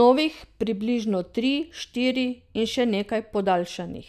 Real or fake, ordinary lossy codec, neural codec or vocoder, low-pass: real; none; none; 14.4 kHz